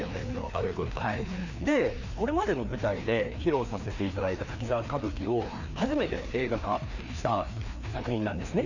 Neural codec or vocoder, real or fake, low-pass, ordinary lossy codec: codec, 16 kHz, 2 kbps, FreqCodec, larger model; fake; 7.2 kHz; none